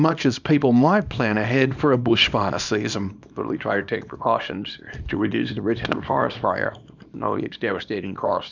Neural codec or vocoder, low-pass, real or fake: codec, 24 kHz, 0.9 kbps, WavTokenizer, small release; 7.2 kHz; fake